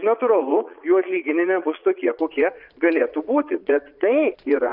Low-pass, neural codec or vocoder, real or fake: 5.4 kHz; vocoder, 22.05 kHz, 80 mel bands, Vocos; fake